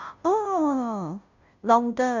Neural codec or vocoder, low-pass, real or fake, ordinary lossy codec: codec, 16 kHz, 0.5 kbps, FunCodec, trained on Chinese and English, 25 frames a second; 7.2 kHz; fake; none